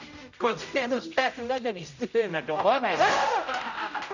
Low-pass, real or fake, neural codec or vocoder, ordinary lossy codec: 7.2 kHz; fake; codec, 16 kHz, 0.5 kbps, X-Codec, HuBERT features, trained on general audio; none